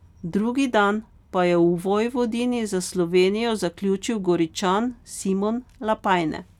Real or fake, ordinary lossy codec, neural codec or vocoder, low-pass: real; none; none; 19.8 kHz